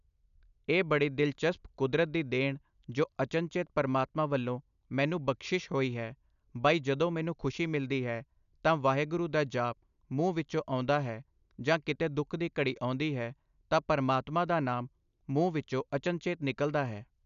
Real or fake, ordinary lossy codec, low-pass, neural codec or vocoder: real; none; 7.2 kHz; none